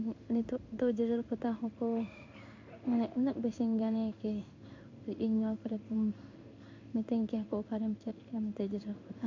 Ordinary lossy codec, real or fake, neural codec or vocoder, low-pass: MP3, 48 kbps; fake; codec, 16 kHz in and 24 kHz out, 1 kbps, XY-Tokenizer; 7.2 kHz